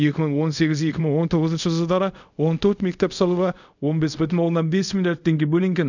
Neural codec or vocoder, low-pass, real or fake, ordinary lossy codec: codec, 24 kHz, 0.5 kbps, DualCodec; 7.2 kHz; fake; none